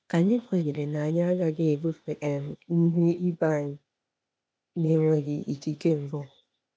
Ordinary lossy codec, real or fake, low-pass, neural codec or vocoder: none; fake; none; codec, 16 kHz, 0.8 kbps, ZipCodec